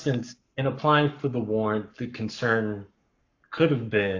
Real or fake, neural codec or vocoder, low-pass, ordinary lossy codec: fake; codec, 44.1 kHz, 7.8 kbps, Pupu-Codec; 7.2 kHz; AAC, 48 kbps